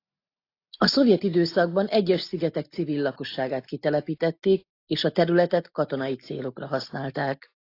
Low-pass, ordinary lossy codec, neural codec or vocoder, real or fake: 5.4 kHz; AAC, 32 kbps; none; real